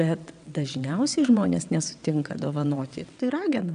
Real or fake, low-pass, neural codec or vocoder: fake; 9.9 kHz; vocoder, 22.05 kHz, 80 mel bands, WaveNeXt